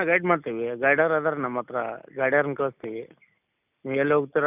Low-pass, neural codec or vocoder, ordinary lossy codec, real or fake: 3.6 kHz; none; none; real